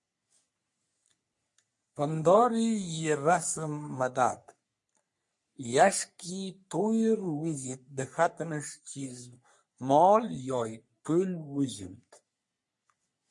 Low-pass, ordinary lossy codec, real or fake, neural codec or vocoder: 10.8 kHz; MP3, 48 kbps; fake; codec, 44.1 kHz, 3.4 kbps, Pupu-Codec